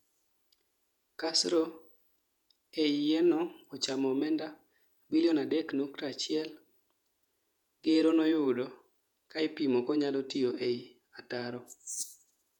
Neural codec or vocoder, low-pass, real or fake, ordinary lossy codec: vocoder, 48 kHz, 128 mel bands, Vocos; 19.8 kHz; fake; none